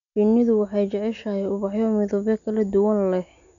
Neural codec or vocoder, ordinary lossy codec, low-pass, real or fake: none; none; 7.2 kHz; real